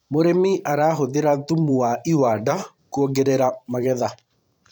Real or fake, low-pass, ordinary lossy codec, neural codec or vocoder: fake; 19.8 kHz; MP3, 96 kbps; vocoder, 44.1 kHz, 128 mel bands every 512 samples, BigVGAN v2